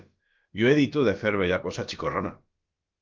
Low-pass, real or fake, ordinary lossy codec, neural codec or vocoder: 7.2 kHz; fake; Opus, 32 kbps; codec, 16 kHz, about 1 kbps, DyCAST, with the encoder's durations